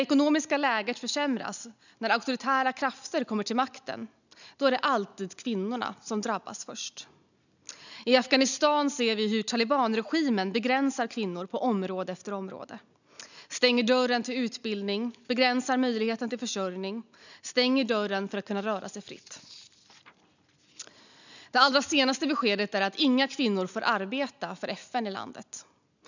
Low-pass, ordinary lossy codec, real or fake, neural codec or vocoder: 7.2 kHz; none; real; none